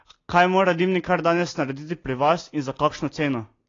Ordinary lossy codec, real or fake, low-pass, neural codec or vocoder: AAC, 32 kbps; real; 7.2 kHz; none